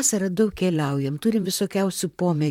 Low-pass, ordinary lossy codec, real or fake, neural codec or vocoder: 19.8 kHz; MP3, 96 kbps; fake; vocoder, 44.1 kHz, 128 mel bands, Pupu-Vocoder